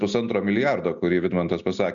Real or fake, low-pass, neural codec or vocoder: real; 7.2 kHz; none